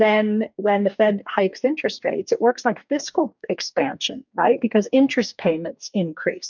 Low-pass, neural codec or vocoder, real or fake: 7.2 kHz; codec, 44.1 kHz, 2.6 kbps, DAC; fake